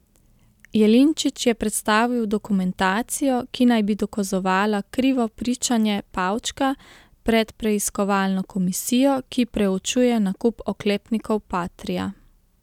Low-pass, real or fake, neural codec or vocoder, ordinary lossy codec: 19.8 kHz; real; none; none